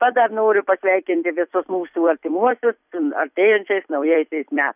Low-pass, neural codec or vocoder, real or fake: 3.6 kHz; vocoder, 44.1 kHz, 128 mel bands every 256 samples, BigVGAN v2; fake